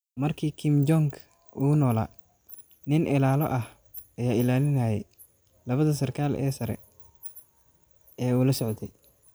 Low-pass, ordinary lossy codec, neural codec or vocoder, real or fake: none; none; none; real